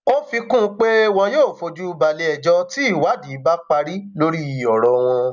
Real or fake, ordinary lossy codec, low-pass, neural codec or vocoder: real; none; 7.2 kHz; none